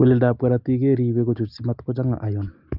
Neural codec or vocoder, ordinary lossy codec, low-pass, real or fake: none; Opus, 24 kbps; 5.4 kHz; real